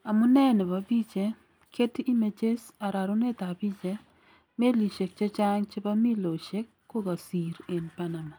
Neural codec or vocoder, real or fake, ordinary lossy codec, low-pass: none; real; none; none